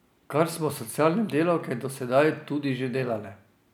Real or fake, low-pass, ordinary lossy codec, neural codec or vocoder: real; none; none; none